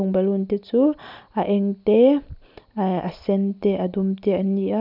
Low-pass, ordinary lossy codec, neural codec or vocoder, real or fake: 5.4 kHz; none; none; real